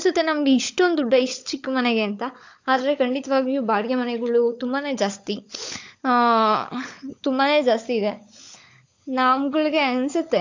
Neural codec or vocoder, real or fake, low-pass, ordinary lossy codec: codec, 16 kHz, 16 kbps, FunCodec, trained on LibriTTS, 50 frames a second; fake; 7.2 kHz; AAC, 48 kbps